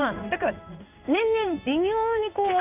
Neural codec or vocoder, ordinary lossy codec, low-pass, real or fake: codec, 16 kHz in and 24 kHz out, 1 kbps, XY-Tokenizer; none; 3.6 kHz; fake